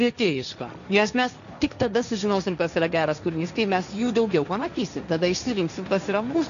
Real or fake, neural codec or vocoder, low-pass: fake; codec, 16 kHz, 1.1 kbps, Voila-Tokenizer; 7.2 kHz